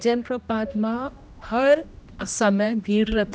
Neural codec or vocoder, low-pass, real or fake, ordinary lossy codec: codec, 16 kHz, 1 kbps, X-Codec, HuBERT features, trained on general audio; none; fake; none